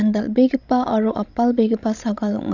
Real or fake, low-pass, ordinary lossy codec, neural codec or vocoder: fake; 7.2 kHz; none; codec, 16 kHz, 16 kbps, FreqCodec, larger model